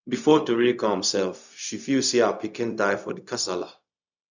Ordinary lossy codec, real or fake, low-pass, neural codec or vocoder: none; fake; 7.2 kHz; codec, 16 kHz, 0.4 kbps, LongCat-Audio-Codec